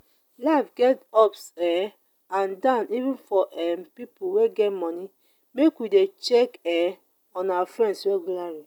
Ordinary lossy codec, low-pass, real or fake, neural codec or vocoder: none; 19.8 kHz; real; none